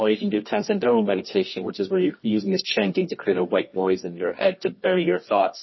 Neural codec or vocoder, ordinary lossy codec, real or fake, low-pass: codec, 24 kHz, 0.9 kbps, WavTokenizer, medium music audio release; MP3, 24 kbps; fake; 7.2 kHz